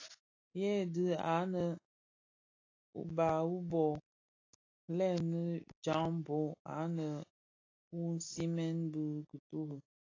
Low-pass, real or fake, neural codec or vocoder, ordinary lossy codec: 7.2 kHz; real; none; AAC, 32 kbps